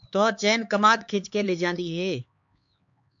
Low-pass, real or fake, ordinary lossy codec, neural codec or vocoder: 7.2 kHz; fake; AAC, 64 kbps; codec, 16 kHz, 4 kbps, X-Codec, HuBERT features, trained on LibriSpeech